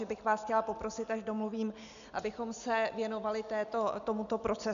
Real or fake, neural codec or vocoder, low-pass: real; none; 7.2 kHz